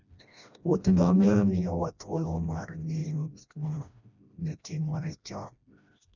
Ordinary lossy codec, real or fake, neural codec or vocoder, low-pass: none; fake; codec, 16 kHz, 1 kbps, FreqCodec, smaller model; 7.2 kHz